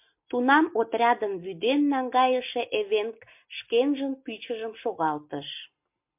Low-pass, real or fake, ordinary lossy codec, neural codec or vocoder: 3.6 kHz; real; MP3, 32 kbps; none